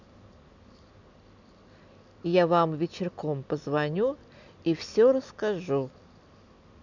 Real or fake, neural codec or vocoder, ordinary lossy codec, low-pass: real; none; none; 7.2 kHz